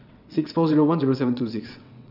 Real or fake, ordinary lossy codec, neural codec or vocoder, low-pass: fake; none; vocoder, 22.05 kHz, 80 mel bands, WaveNeXt; 5.4 kHz